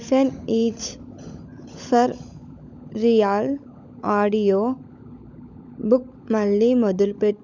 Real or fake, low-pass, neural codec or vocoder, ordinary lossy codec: fake; 7.2 kHz; codec, 16 kHz, 16 kbps, FunCodec, trained on LibriTTS, 50 frames a second; none